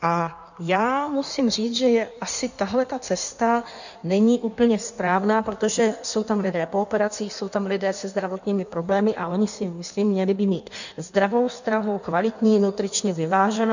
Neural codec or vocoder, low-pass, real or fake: codec, 16 kHz in and 24 kHz out, 1.1 kbps, FireRedTTS-2 codec; 7.2 kHz; fake